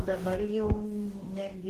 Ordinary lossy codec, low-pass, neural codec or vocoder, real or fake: Opus, 16 kbps; 14.4 kHz; codec, 44.1 kHz, 2.6 kbps, DAC; fake